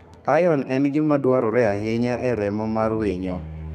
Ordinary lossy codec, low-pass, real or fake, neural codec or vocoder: none; 14.4 kHz; fake; codec, 32 kHz, 1.9 kbps, SNAC